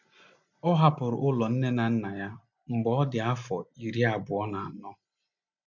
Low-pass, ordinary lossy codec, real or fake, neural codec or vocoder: 7.2 kHz; none; real; none